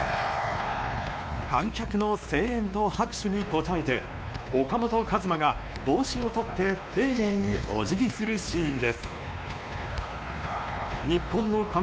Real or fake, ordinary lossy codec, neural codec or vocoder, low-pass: fake; none; codec, 16 kHz, 2 kbps, X-Codec, WavLM features, trained on Multilingual LibriSpeech; none